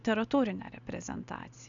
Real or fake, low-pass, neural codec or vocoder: real; 7.2 kHz; none